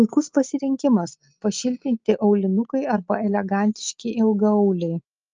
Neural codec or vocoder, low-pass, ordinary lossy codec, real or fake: none; 7.2 kHz; Opus, 24 kbps; real